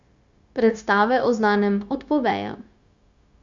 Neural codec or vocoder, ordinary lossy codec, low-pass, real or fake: codec, 16 kHz, 0.9 kbps, LongCat-Audio-Codec; Opus, 64 kbps; 7.2 kHz; fake